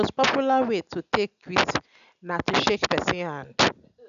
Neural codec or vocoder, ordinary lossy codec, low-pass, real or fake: none; none; 7.2 kHz; real